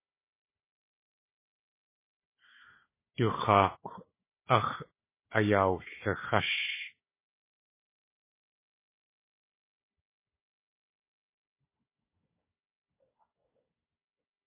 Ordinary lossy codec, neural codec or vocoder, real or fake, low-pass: MP3, 16 kbps; codec, 16 kHz, 4 kbps, FunCodec, trained on Chinese and English, 50 frames a second; fake; 3.6 kHz